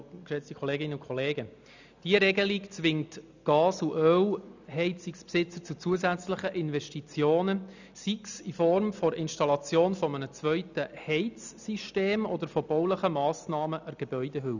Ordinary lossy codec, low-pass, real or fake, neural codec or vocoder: none; 7.2 kHz; real; none